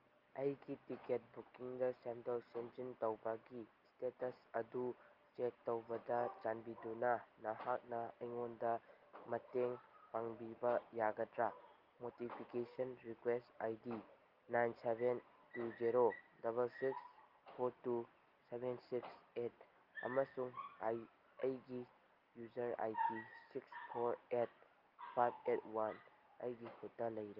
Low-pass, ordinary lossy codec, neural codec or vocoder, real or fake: 5.4 kHz; Opus, 24 kbps; none; real